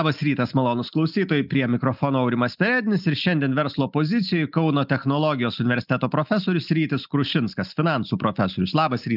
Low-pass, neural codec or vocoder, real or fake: 5.4 kHz; none; real